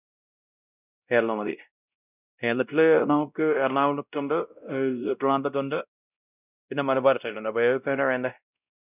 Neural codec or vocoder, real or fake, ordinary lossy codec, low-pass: codec, 16 kHz, 0.5 kbps, X-Codec, WavLM features, trained on Multilingual LibriSpeech; fake; none; 3.6 kHz